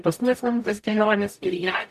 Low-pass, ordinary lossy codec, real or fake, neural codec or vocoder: 14.4 kHz; MP3, 96 kbps; fake; codec, 44.1 kHz, 0.9 kbps, DAC